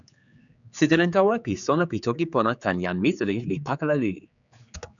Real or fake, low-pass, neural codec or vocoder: fake; 7.2 kHz; codec, 16 kHz, 4 kbps, X-Codec, HuBERT features, trained on general audio